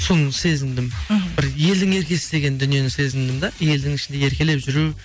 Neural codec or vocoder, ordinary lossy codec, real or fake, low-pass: none; none; real; none